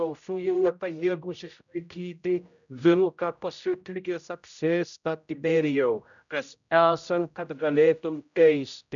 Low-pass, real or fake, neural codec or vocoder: 7.2 kHz; fake; codec, 16 kHz, 0.5 kbps, X-Codec, HuBERT features, trained on general audio